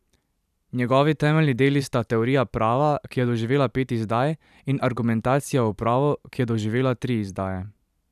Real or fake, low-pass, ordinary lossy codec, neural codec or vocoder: fake; 14.4 kHz; none; vocoder, 44.1 kHz, 128 mel bands every 512 samples, BigVGAN v2